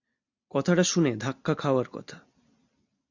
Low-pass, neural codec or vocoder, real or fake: 7.2 kHz; none; real